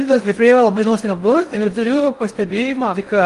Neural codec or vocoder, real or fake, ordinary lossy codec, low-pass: codec, 16 kHz in and 24 kHz out, 0.6 kbps, FocalCodec, streaming, 4096 codes; fake; Opus, 24 kbps; 10.8 kHz